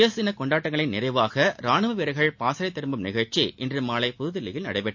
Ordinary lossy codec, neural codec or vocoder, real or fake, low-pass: MP3, 48 kbps; none; real; 7.2 kHz